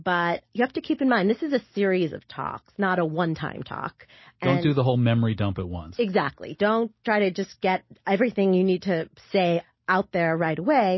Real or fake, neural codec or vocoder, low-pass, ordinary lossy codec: real; none; 7.2 kHz; MP3, 24 kbps